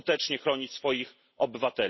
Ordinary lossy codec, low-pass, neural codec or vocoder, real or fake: MP3, 24 kbps; 7.2 kHz; none; real